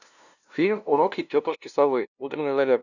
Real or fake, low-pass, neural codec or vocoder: fake; 7.2 kHz; codec, 16 kHz, 0.5 kbps, FunCodec, trained on LibriTTS, 25 frames a second